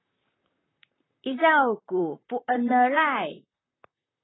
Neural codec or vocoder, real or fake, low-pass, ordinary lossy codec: vocoder, 44.1 kHz, 128 mel bands, Pupu-Vocoder; fake; 7.2 kHz; AAC, 16 kbps